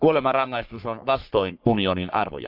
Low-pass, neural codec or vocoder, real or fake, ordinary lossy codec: 5.4 kHz; codec, 44.1 kHz, 3.4 kbps, Pupu-Codec; fake; none